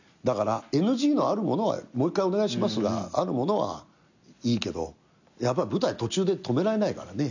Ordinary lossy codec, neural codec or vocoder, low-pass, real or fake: none; none; 7.2 kHz; real